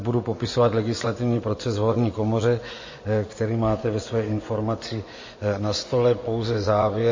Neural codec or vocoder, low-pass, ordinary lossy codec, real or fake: vocoder, 24 kHz, 100 mel bands, Vocos; 7.2 kHz; MP3, 32 kbps; fake